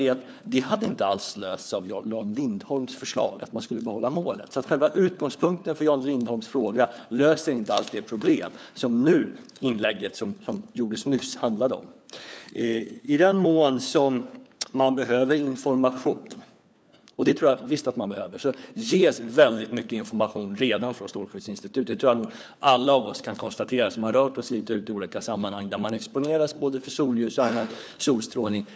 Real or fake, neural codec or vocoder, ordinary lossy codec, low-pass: fake; codec, 16 kHz, 4 kbps, FunCodec, trained on LibriTTS, 50 frames a second; none; none